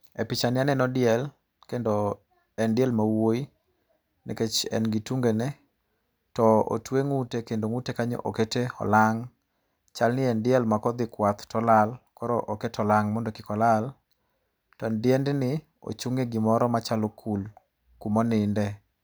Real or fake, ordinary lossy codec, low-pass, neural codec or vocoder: real; none; none; none